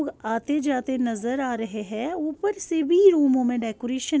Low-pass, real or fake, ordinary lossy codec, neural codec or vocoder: none; real; none; none